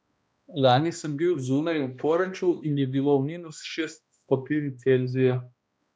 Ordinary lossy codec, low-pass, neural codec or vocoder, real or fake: none; none; codec, 16 kHz, 1 kbps, X-Codec, HuBERT features, trained on balanced general audio; fake